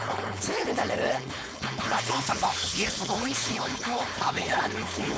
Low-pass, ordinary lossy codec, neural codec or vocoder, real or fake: none; none; codec, 16 kHz, 4.8 kbps, FACodec; fake